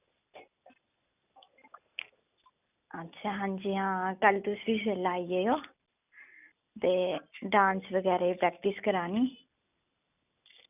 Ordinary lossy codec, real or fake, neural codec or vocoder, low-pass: none; real; none; 3.6 kHz